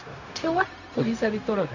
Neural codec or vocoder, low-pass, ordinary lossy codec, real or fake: codec, 16 kHz, 0.4 kbps, LongCat-Audio-Codec; 7.2 kHz; none; fake